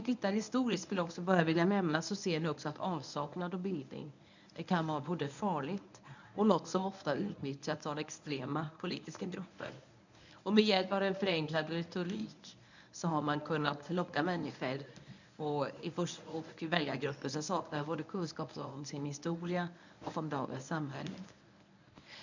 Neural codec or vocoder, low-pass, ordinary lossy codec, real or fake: codec, 24 kHz, 0.9 kbps, WavTokenizer, medium speech release version 1; 7.2 kHz; none; fake